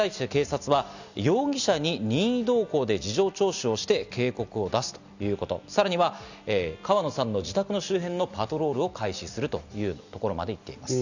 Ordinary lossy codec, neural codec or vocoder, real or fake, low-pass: none; none; real; 7.2 kHz